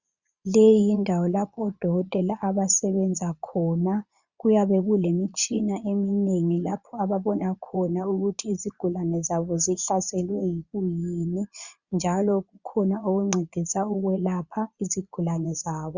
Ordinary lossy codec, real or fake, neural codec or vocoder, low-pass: Opus, 64 kbps; fake; vocoder, 22.05 kHz, 80 mel bands, Vocos; 7.2 kHz